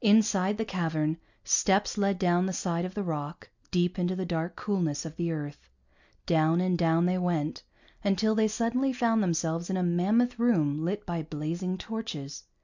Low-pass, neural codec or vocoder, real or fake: 7.2 kHz; none; real